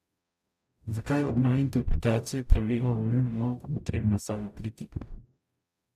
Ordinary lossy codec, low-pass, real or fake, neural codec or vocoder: none; 14.4 kHz; fake; codec, 44.1 kHz, 0.9 kbps, DAC